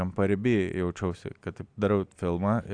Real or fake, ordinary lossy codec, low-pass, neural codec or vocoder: real; Opus, 64 kbps; 9.9 kHz; none